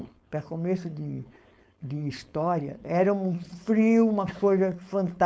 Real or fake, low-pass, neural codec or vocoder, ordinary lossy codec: fake; none; codec, 16 kHz, 4.8 kbps, FACodec; none